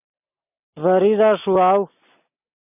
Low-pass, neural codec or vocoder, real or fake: 3.6 kHz; none; real